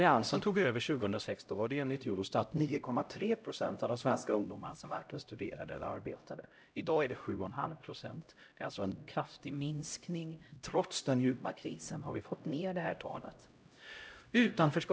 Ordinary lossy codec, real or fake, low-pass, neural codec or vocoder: none; fake; none; codec, 16 kHz, 0.5 kbps, X-Codec, HuBERT features, trained on LibriSpeech